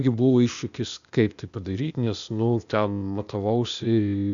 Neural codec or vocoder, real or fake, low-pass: codec, 16 kHz, 0.8 kbps, ZipCodec; fake; 7.2 kHz